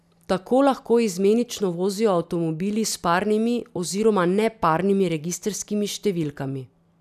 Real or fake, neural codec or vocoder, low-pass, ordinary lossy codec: real; none; 14.4 kHz; none